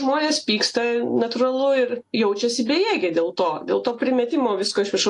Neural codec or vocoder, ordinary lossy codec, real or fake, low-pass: none; AAC, 48 kbps; real; 10.8 kHz